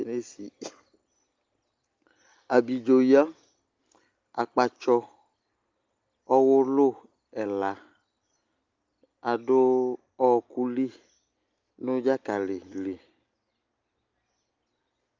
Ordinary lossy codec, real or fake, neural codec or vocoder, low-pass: Opus, 24 kbps; real; none; 7.2 kHz